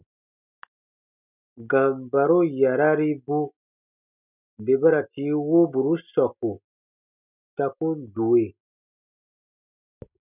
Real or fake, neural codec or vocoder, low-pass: real; none; 3.6 kHz